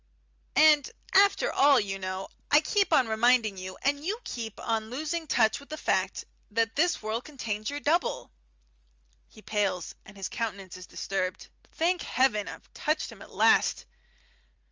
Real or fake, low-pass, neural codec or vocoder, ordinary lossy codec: real; 7.2 kHz; none; Opus, 32 kbps